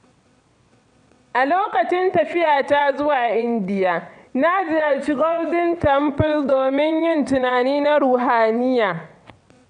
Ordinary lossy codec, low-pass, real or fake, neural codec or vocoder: none; 9.9 kHz; fake; vocoder, 22.05 kHz, 80 mel bands, WaveNeXt